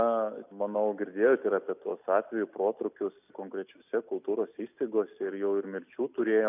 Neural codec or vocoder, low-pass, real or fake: none; 3.6 kHz; real